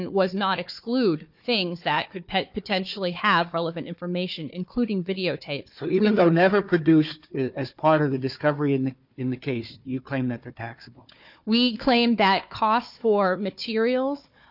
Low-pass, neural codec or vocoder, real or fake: 5.4 kHz; codec, 16 kHz, 4 kbps, FunCodec, trained on Chinese and English, 50 frames a second; fake